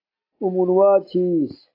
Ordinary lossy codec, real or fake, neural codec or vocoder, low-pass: AAC, 32 kbps; real; none; 5.4 kHz